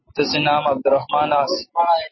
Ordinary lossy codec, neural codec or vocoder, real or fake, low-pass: MP3, 24 kbps; none; real; 7.2 kHz